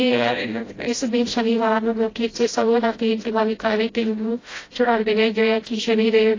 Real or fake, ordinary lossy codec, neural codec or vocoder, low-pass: fake; AAC, 32 kbps; codec, 16 kHz, 0.5 kbps, FreqCodec, smaller model; 7.2 kHz